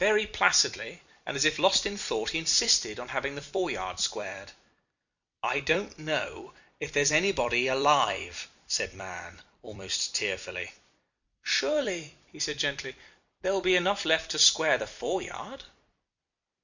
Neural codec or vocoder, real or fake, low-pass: vocoder, 44.1 kHz, 128 mel bands every 256 samples, BigVGAN v2; fake; 7.2 kHz